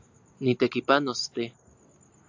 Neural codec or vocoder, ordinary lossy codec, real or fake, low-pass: none; AAC, 48 kbps; real; 7.2 kHz